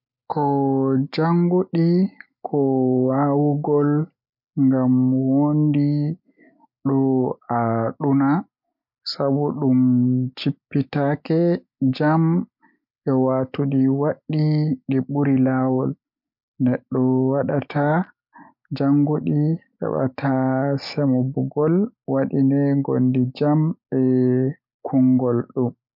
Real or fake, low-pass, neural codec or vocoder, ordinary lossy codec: real; 5.4 kHz; none; MP3, 48 kbps